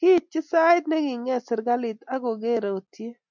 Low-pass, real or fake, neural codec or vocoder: 7.2 kHz; real; none